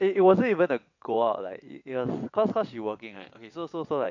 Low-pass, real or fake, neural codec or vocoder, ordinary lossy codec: 7.2 kHz; fake; vocoder, 22.05 kHz, 80 mel bands, WaveNeXt; none